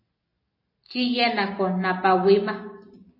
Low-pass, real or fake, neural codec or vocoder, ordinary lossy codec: 5.4 kHz; real; none; MP3, 24 kbps